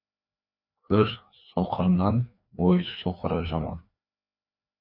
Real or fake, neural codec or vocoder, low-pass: fake; codec, 16 kHz, 2 kbps, FreqCodec, larger model; 5.4 kHz